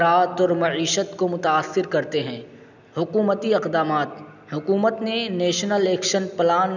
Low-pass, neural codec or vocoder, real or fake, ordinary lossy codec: 7.2 kHz; none; real; none